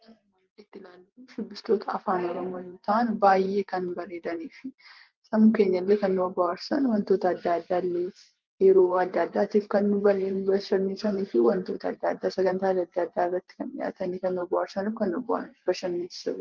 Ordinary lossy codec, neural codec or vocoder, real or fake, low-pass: Opus, 32 kbps; vocoder, 44.1 kHz, 128 mel bands every 512 samples, BigVGAN v2; fake; 7.2 kHz